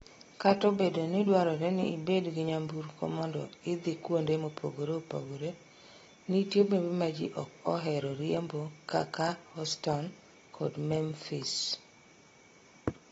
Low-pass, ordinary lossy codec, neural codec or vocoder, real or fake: 19.8 kHz; AAC, 24 kbps; none; real